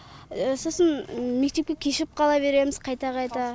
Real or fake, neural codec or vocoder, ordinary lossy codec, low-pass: real; none; none; none